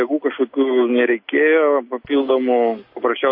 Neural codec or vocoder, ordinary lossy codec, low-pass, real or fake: none; MP3, 32 kbps; 5.4 kHz; real